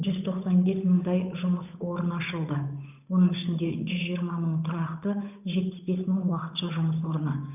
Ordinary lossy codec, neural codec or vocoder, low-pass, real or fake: none; codec, 16 kHz, 8 kbps, FunCodec, trained on Chinese and English, 25 frames a second; 3.6 kHz; fake